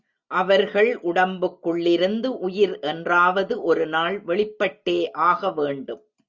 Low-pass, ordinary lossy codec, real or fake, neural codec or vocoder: 7.2 kHz; Opus, 64 kbps; real; none